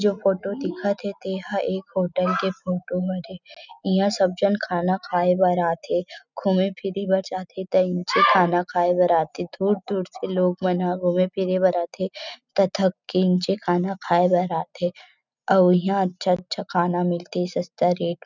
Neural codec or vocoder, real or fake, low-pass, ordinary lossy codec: none; real; 7.2 kHz; none